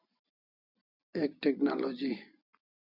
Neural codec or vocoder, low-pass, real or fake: vocoder, 44.1 kHz, 128 mel bands every 256 samples, BigVGAN v2; 5.4 kHz; fake